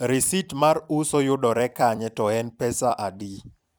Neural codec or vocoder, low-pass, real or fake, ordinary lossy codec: vocoder, 44.1 kHz, 128 mel bands every 256 samples, BigVGAN v2; none; fake; none